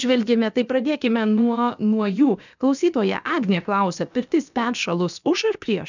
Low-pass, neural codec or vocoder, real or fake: 7.2 kHz; codec, 16 kHz, about 1 kbps, DyCAST, with the encoder's durations; fake